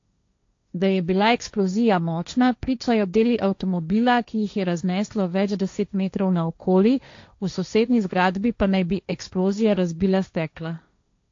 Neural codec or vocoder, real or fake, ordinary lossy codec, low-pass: codec, 16 kHz, 1.1 kbps, Voila-Tokenizer; fake; AAC, 48 kbps; 7.2 kHz